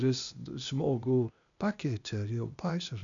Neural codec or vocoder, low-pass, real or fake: codec, 16 kHz, 0.8 kbps, ZipCodec; 7.2 kHz; fake